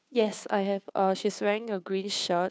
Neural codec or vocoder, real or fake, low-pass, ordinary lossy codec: codec, 16 kHz, 2 kbps, FunCodec, trained on Chinese and English, 25 frames a second; fake; none; none